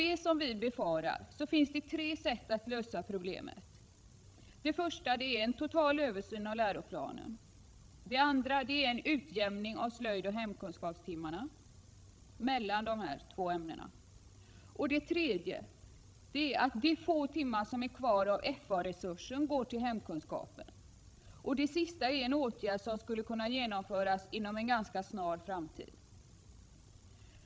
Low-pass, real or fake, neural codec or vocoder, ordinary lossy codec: none; fake; codec, 16 kHz, 16 kbps, FreqCodec, larger model; none